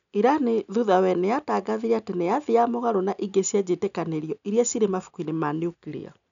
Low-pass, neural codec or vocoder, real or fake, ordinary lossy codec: 7.2 kHz; none; real; none